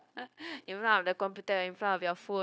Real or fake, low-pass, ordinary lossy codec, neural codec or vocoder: fake; none; none; codec, 16 kHz, 0.9 kbps, LongCat-Audio-Codec